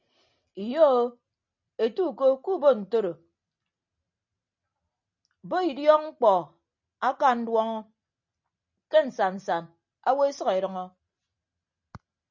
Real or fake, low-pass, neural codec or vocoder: real; 7.2 kHz; none